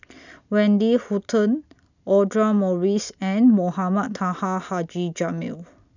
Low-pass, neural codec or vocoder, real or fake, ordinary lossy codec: 7.2 kHz; none; real; none